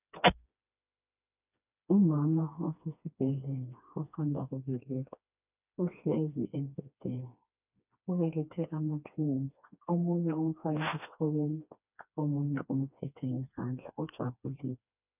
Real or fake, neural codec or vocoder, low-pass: fake; codec, 16 kHz, 2 kbps, FreqCodec, smaller model; 3.6 kHz